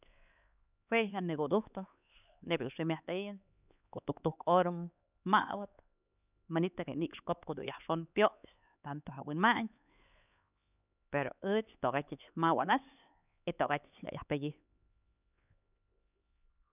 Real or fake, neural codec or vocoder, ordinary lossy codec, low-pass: fake; codec, 16 kHz, 4 kbps, X-Codec, HuBERT features, trained on LibriSpeech; none; 3.6 kHz